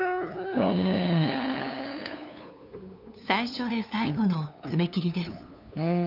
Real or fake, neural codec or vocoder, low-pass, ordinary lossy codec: fake; codec, 16 kHz, 2 kbps, FunCodec, trained on LibriTTS, 25 frames a second; 5.4 kHz; none